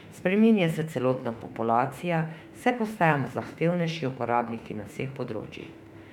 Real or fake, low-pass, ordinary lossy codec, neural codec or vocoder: fake; 19.8 kHz; none; autoencoder, 48 kHz, 32 numbers a frame, DAC-VAE, trained on Japanese speech